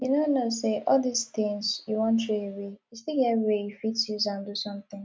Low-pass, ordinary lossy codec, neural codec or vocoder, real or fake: none; none; none; real